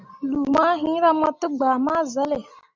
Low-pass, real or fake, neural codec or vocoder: 7.2 kHz; real; none